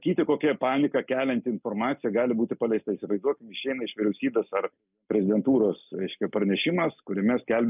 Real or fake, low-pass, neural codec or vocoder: real; 3.6 kHz; none